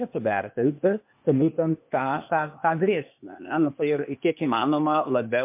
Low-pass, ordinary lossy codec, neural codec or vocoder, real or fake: 3.6 kHz; MP3, 32 kbps; codec, 16 kHz, 0.8 kbps, ZipCodec; fake